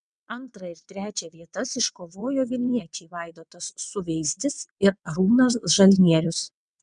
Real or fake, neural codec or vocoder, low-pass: fake; vocoder, 22.05 kHz, 80 mel bands, WaveNeXt; 9.9 kHz